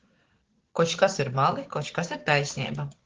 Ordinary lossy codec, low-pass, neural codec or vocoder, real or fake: Opus, 16 kbps; 7.2 kHz; codec, 16 kHz, 6 kbps, DAC; fake